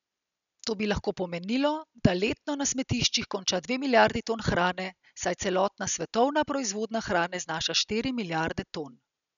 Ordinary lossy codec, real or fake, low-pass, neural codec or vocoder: none; real; 7.2 kHz; none